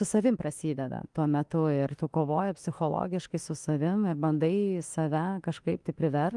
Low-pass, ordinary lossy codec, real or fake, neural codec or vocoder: 10.8 kHz; Opus, 24 kbps; fake; autoencoder, 48 kHz, 32 numbers a frame, DAC-VAE, trained on Japanese speech